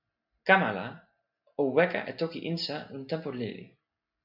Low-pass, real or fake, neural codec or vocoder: 5.4 kHz; real; none